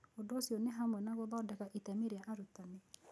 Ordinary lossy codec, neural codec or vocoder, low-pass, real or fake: none; none; none; real